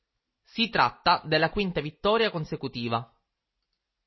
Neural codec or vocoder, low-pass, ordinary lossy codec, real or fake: none; 7.2 kHz; MP3, 24 kbps; real